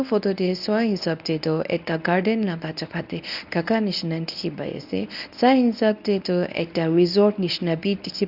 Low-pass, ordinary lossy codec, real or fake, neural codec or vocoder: 5.4 kHz; none; fake; codec, 24 kHz, 0.9 kbps, WavTokenizer, medium speech release version 1